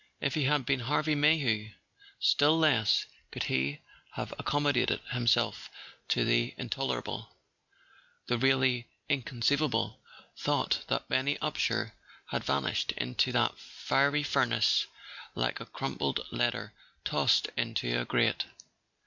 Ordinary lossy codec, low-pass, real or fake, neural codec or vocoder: MP3, 64 kbps; 7.2 kHz; real; none